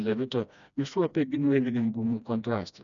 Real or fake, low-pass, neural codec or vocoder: fake; 7.2 kHz; codec, 16 kHz, 1 kbps, FreqCodec, smaller model